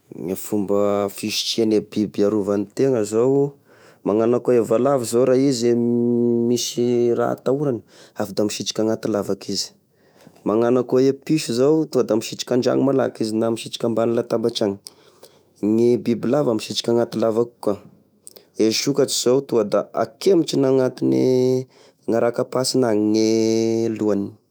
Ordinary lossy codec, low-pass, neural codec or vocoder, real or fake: none; none; autoencoder, 48 kHz, 128 numbers a frame, DAC-VAE, trained on Japanese speech; fake